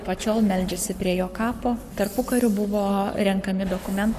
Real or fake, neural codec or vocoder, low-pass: fake; codec, 44.1 kHz, 7.8 kbps, Pupu-Codec; 14.4 kHz